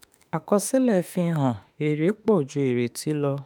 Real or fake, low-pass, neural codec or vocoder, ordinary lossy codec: fake; none; autoencoder, 48 kHz, 32 numbers a frame, DAC-VAE, trained on Japanese speech; none